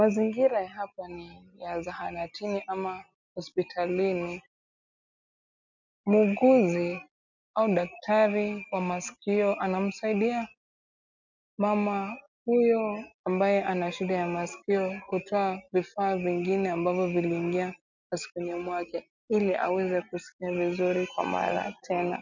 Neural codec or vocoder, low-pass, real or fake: none; 7.2 kHz; real